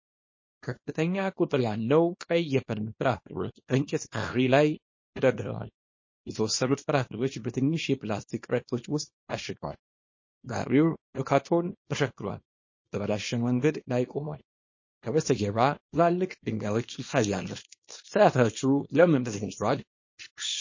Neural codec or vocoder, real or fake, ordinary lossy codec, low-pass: codec, 24 kHz, 0.9 kbps, WavTokenizer, small release; fake; MP3, 32 kbps; 7.2 kHz